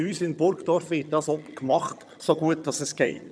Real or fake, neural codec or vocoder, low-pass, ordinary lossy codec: fake; vocoder, 22.05 kHz, 80 mel bands, HiFi-GAN; none; none